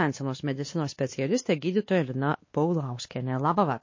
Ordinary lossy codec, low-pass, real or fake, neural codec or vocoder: MP3, 32 kbps; 7.2 kHz; fake; codec, 16 kHz, 2 kbps, X-Codec, WavLM features, trained on Multilingual LibriSpeech